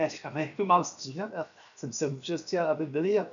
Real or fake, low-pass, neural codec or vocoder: fake; 7.2 kHz; codec, 16 kHz, 0.7 kbps, FocalCodec